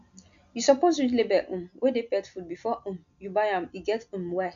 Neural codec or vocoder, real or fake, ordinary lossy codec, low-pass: none; real; none; 7.2 kHz